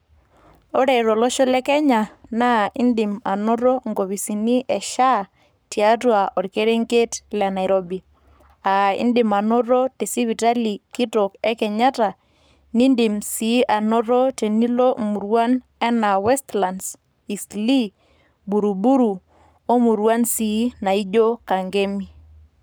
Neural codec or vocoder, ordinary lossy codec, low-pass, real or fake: codec, 44.1 kHz, 7.8 kbps, Pupu-Codec; none; none; fake